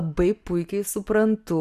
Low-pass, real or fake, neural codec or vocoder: 14.4 kHz; real; none